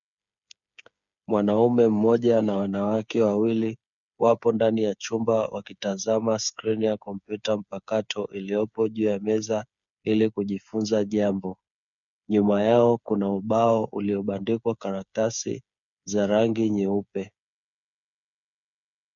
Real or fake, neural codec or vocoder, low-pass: fake; codec, 16 kHz, 8 kbps, FreqCodec, smaller model; 7.2 kHz